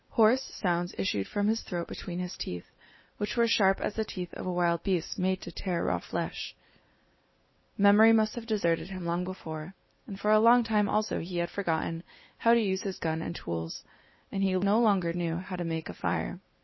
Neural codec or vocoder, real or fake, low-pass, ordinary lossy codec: none; real; 7.2 kHz; MP3, 24 kbps